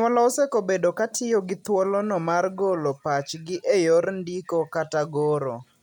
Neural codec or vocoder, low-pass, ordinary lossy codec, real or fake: none; 19.8 kHz; none; real